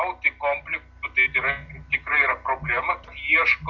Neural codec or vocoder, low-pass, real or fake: none; 7.2 kHz; real